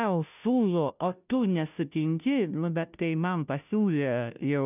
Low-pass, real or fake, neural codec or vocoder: 3.6 kHz; fake; codec, 16 kHz, 0.5 kbps, FunCodec, trained on Chinese and English, 25 frames a second